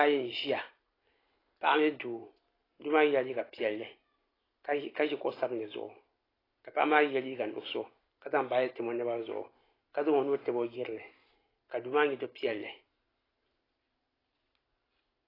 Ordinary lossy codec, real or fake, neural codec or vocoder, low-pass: AAC, 24 kbps; real; none; 5.4 kHz